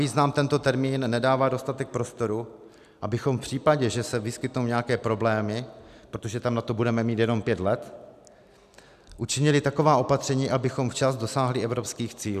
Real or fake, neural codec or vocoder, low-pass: real; none; 14.4 kHz